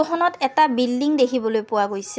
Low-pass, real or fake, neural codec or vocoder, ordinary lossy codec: none; real; none; none